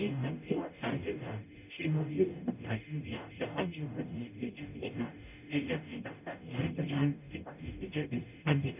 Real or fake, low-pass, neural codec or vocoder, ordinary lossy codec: fake; 3.6 kHz; codec, 44.1 kHz, 0.9 kbps, DAC; none